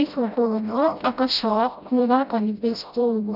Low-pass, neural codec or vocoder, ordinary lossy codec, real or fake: 5.4 kHz; codec, 16 kHz, 0.5 kbps, FreqCodec, smaller model; none; fake